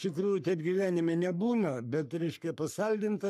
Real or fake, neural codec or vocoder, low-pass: fake; codec, 44.1 kHz, 3.4 kbps, Pupu-Codec; 14.4 kHz